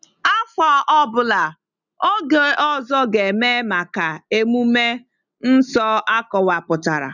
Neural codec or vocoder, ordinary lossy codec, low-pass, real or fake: none; none; 7.2 kHz; real